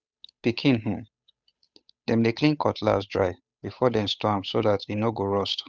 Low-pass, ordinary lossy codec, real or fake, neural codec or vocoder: none; none; fake; codec, 16 kHz, 8 kbps, FunCodec, trained on Chinese and English, 25 frames a second